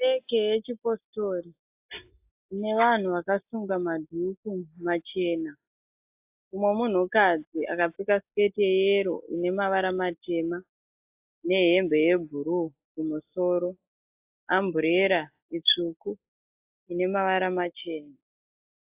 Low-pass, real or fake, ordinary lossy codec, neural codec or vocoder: 3.6 kHz; real; AAC, 32 kbps; none